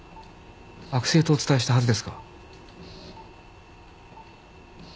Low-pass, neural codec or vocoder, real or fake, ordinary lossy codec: none; none; real; none